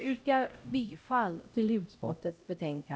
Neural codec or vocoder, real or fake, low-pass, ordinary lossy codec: codec, 16 kHz, 0.5 kbps, X-Codec, HuBERT features, trained on LibriSpeech; fake; none; none